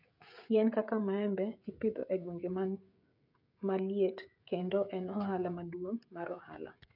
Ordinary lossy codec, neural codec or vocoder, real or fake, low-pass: none; codec, 16 kHz, 16 kbps, FreqCodec, smaller model; fake; 5.4 kHz